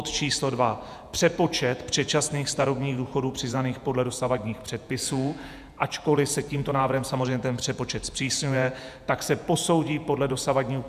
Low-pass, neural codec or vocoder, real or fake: 14.4 kHz; vocoder, 48 kHz, 128 mel bands, Vocos; fake